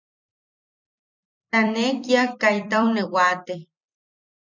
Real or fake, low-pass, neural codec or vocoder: fake; 7.2 kHz; vocoder, 44.1 kHz, 128 mel bands every 256 samples, BigVGAN v2